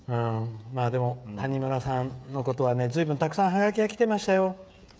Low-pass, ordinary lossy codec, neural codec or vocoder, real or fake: none; none; codec, 16 kHz, 16 kbps, FreqCodec, smaller model; fake